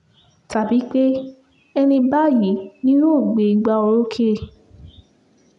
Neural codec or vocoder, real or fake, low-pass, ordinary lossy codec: none; real; 10.8 kHz; none